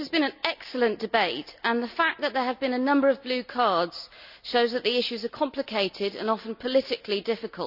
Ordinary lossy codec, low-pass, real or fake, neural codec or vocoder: none; 5.4 kHz; real; none